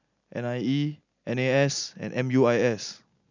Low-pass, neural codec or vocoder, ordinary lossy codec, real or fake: 7.2 kHz; none; none; real